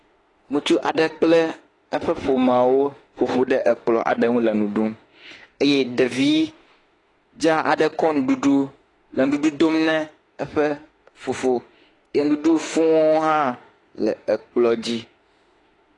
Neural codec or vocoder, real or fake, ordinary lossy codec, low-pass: autoencoder, 48 kHz, 32 numbers a frame, DAC-VAE, trained on Japanese speech; fake; AAC, 32 kbps; 10.8 kHz